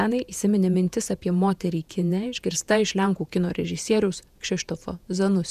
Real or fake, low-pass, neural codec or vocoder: fake; 14.4 kHz; vocoder, 48 kHz, 128 mel bands, Vocos